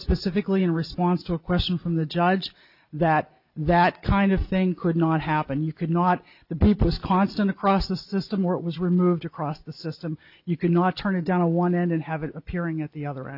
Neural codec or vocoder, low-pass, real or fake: none; 5.4 kHz; real